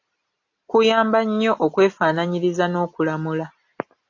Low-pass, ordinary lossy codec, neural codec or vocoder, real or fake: 7.2 kHz; AAC, 48 kbps; none; real